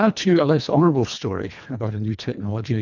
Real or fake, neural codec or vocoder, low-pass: fake; codec, 24 kHz, 1.5 kbps, HILCodec; 7.2 kHz